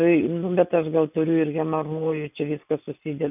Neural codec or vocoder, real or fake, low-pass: none; real; 3.6 kHz